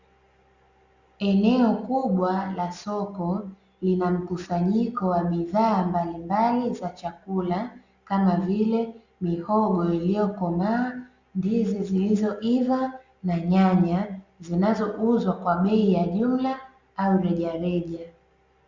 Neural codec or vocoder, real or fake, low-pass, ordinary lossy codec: none; real; 7.2 kHz; Opus, 64 kbps